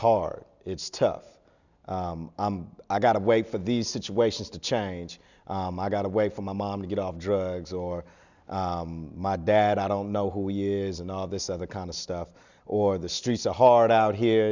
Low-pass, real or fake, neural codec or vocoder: 7.2 kHz; real; none